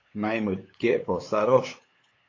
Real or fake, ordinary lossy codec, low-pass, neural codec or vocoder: fake; AAC, 32 kbps; 7.2 kHz; codec, 16 kHz, 8 kbps, FunCodec, trained on LibriTTS, 25 frames a second